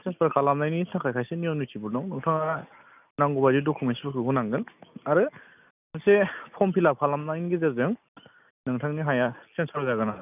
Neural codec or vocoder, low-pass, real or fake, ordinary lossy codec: none; 3.6 kHz; real; none